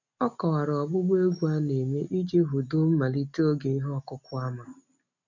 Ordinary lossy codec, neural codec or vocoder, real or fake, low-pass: none; none; real; 7.2 kHz